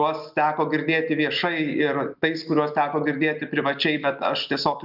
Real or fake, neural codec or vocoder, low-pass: real; none; 5.4 kHz